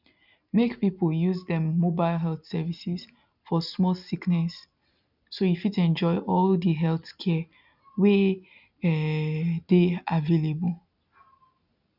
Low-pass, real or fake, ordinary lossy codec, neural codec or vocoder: 5.4 kHz; real; none; none